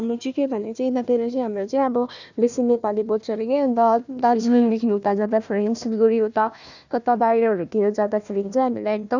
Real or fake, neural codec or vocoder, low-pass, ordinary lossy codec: fake; codec, 16 kHz, 1 kbps, FunCodec, trained on Chinese and English, 50 frames a second; 7.2 kHz; none